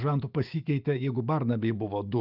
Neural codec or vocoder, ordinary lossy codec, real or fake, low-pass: autoencoder, 48 kHz, 128 numbers a frame, DAC-VAE, trained on Japanese speech; Opus, 24 kbps; fake; 5.4 kHz